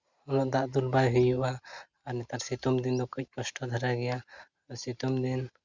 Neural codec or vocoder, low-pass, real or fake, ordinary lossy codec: none; 7.2 kHz; real; Opus, 64 kbps